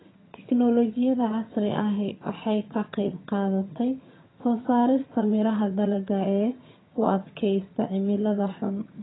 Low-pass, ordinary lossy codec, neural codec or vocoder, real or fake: 7.2 kHz; AAC, 16 kbps; vocoder, 22.05 kHz, 80 mel bands, HiFi-GAN; fake